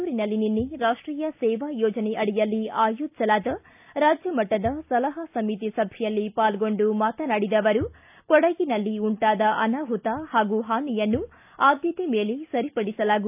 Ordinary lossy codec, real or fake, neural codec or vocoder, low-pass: AAC, 32 kbps; real; none; 3.6 kHz